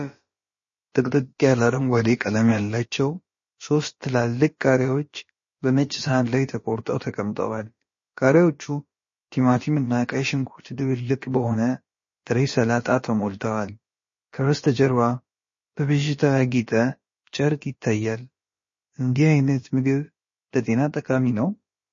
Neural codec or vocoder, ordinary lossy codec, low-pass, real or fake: codec, 16 kHz, about 1 kbps, DyCAST, with the encoder's durations; MP3, 32 kbps; 7.2 kHz; fake